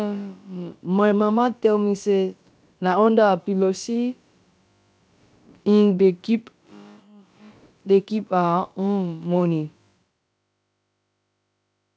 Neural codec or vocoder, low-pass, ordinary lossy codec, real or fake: codec, 16 kHz, about 1 kbps, DyCAST, with the encoder's durations; none; none; fake